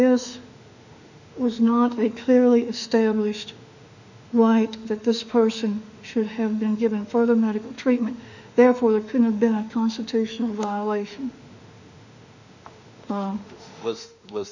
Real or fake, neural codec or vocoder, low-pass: fake; autoencoder, 48 kHz, 32 numbers a frame, DAC-VAE, trained on Japanese speech; 7.2 kHz